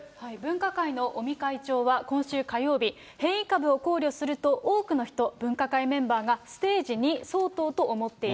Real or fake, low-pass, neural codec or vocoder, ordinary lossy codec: real; none; none; none